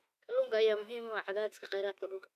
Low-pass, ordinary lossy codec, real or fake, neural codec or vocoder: 14.4 kHz; none; fake; autoencoder, 48 kHz, 32 numbers a frame, DAC-VAE, trained on Japanese speech